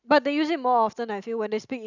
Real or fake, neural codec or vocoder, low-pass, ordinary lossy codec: real; none; 7.2 kHz; none